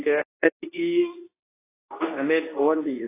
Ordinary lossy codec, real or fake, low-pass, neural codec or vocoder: AAC, 16 kbps; fake; 3.6 kHz; codec, 24 kHz, 0.9 kbps, WavTokenizer, medium speech release version 2